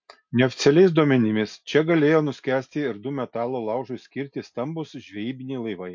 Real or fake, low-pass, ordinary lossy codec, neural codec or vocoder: real; 7.2 kHz; MP3, 48 kbps; none